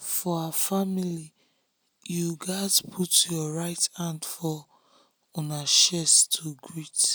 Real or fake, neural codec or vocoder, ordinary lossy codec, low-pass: real; none; none; none